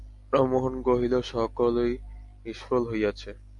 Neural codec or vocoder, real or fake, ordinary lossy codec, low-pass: none; real; AAC, 64 kbps; 10.8 kHz